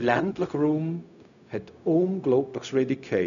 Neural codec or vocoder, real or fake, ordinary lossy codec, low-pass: codec, 16 kHz, 0.4 kbps, LongCat-Audio-Codec; fake; none; 7.2 kHz